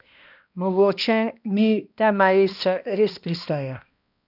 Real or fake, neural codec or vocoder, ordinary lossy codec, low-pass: fake; codec, 16 kHz, 1 kbps, X-Codec, HuBERT features, trained on balanced general audio; none; 5.4 kHz